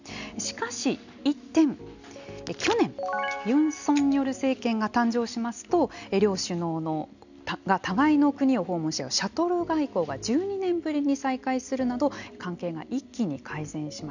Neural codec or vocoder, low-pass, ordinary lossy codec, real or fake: none; 7.2 kHz; none; real